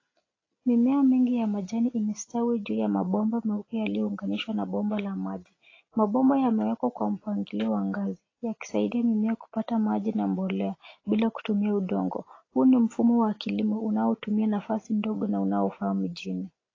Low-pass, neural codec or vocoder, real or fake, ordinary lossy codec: 7.2 kHz; none; real; AAC, 32 kbps